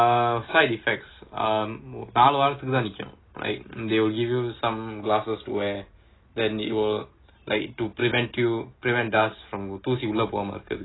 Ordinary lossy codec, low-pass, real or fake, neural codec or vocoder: AAC, 16 kbps; 7.2 kHz; real; none